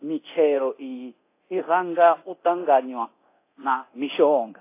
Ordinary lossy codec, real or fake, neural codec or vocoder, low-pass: AAC, 24 kbps; fake; codec, 24 kHz, 0.9 kbps, DualCodec; 3.6 kHz